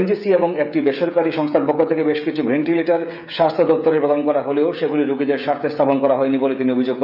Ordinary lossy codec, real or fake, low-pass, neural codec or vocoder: none; fake; 5.4 kHz; codec, 16 kHz, 16 kbps, FreqCodec, smaller model